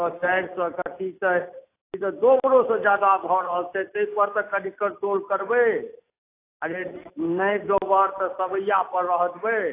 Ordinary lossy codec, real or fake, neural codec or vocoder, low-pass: AAC, 24 kbps; real; none; 3.6 kHz